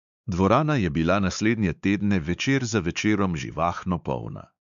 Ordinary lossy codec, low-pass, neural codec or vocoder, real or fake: none; 7.2 kHz; codec, 16 kHz, 4 kbps, X-Codec, WavLM features, trained on Multilingual LibriSpeech; fake